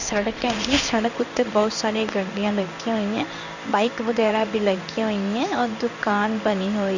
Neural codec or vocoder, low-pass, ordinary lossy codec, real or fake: codec, 16 kHz in and 24 kHz out, 1 kbps, XY-Tokenizer; 7.2 kHz; none; fake